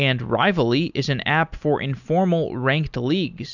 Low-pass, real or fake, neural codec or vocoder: 7.2 kHz; real; none